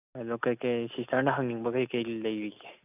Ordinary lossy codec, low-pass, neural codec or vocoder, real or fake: none; 3.6 kHz; none; real